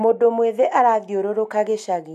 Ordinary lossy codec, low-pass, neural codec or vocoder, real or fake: AAC, 96 kbps; 14.4 kHz; none; real